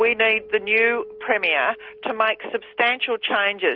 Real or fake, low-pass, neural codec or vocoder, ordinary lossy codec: real; 5.4 kHz; none; Opus, 32 kbps